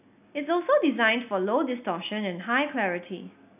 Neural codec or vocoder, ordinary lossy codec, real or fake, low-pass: none; none; real; 3.6 kHz